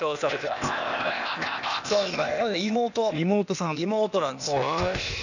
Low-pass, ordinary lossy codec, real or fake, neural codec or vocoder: 7.2 kHz; none; fake; codec, 16 kHz, 0.8 kbps, ZipCodec